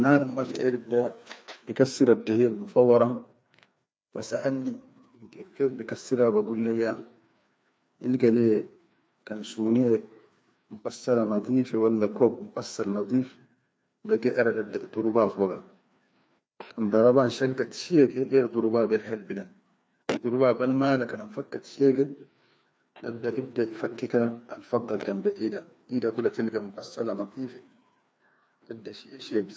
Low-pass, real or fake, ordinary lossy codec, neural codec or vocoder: none; fake; none; codec, 16 kHz, 2 kbps, FreqCodec, larger model